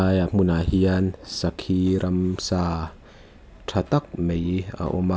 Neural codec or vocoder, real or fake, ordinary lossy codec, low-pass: none; real; none; none